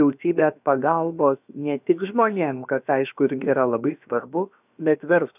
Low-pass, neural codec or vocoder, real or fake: 3.6 kHz; codec, 16 kHz, about 1 kbps, DyCAST, with the encoder's durations; fake